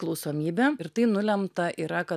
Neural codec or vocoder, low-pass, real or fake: none; 14.4 kHz; real